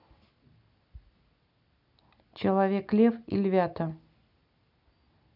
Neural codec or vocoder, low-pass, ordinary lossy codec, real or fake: none; 5.4 kHz; none; real